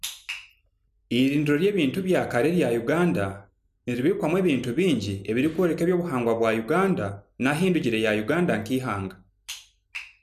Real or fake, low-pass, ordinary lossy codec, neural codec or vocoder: real; 14.4 kHz; none; none